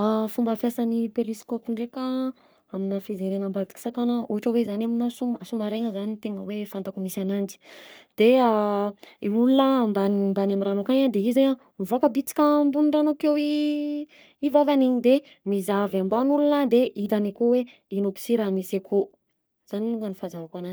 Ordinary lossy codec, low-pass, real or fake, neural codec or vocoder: none; none; fake; codec, 44.1 kHz, 3.4 kbps, Pupu-Codec